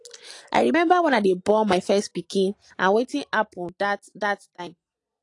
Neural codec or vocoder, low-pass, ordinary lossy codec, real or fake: none; 10.8 kHz; AAC, 48 kbps; real